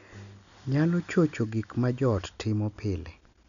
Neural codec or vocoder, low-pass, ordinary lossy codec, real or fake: none; 7.2 kHz; none; real